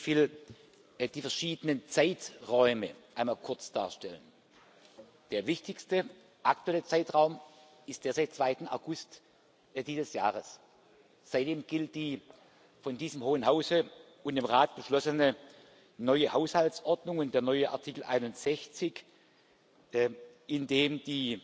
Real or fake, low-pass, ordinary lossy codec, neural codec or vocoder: real; none; none; none